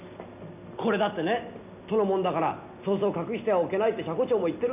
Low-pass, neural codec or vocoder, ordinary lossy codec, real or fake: 3.6 kHz; none; none; real